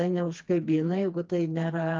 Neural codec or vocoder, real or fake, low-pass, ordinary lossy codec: codec, 16 kHz, 2 kbps, FreqCodec, smaller model; fake; 7.2 kHz; Opus, 24 kbps